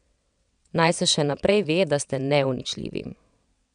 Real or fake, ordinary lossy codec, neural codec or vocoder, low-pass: fake; none; vocoder, 22.05 kHz, 80 mel bands, WaveNeXt; 9.9 kHz